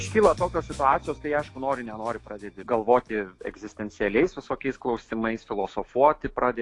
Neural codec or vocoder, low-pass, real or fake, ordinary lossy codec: autoencoder, 48 kHz, 128 numbers a frame, DAC-VAE, trained on Japanese speech; 10.8 kHz; fake; AAC, 48 kbps